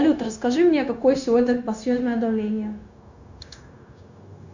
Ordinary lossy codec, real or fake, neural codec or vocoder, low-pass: Opus, 64 kbps; fake; codec, 16 kHz, 0.9 kbps, LongCat-Audio-Codec; 7.2 kHz